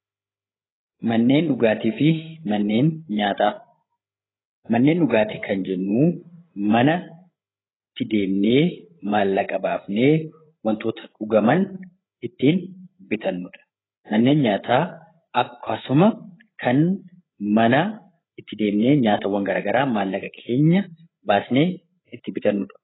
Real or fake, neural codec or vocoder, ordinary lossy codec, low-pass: fake; codec, 16 kHz, 8 kbps, FreqCodec, larger model; AAC, 16 kbps; 7.2 kHz